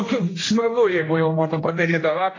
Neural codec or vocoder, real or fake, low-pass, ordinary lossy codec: codec, 16 kHz, 1 kbps, X-Codec, HuBERT features, trained on general audio; fake; 7.2 kHz; AAC, 32 kbps